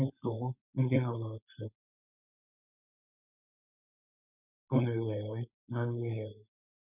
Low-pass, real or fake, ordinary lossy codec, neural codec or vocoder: 3.6 kHz; fake; none; codec, 16 kHz, 4.8 kbps, FACodec